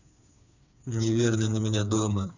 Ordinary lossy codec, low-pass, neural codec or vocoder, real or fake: none; 7.2 kHz; codec, 16 kHz, 4 kbps, FreqCodec, smaller model; fake